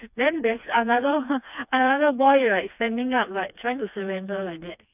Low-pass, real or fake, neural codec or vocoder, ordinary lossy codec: 3.6 kHz; fake; codec, 16 kHz, 2 kbps, FreqCodec, smaller model; none